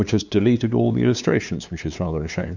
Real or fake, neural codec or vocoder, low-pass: fake; codec, 16 kHz, 2 kbps, FunCodec, trained on LibriTTS, 25 frames a second; 7.2 kHz